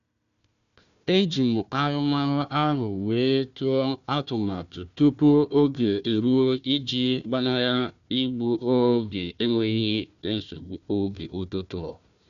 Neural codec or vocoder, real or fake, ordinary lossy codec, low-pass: codec, 16 kHz, 1 kbps, FunCodec, trained on Chinese and English, 50 frames a second; fake; none; 7.2 kHz